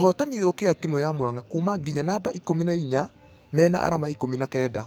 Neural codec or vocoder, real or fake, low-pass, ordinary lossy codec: codec, 44.1 kHz, 2.6 kbps, SNAC; fake; none; none